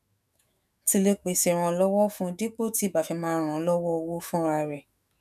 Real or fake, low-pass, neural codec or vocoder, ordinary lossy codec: fake; 14.4 kHz; autoencoder, 48 kHz, 128 numbers a frame, DAC-VAE, trained on Japanese speech; none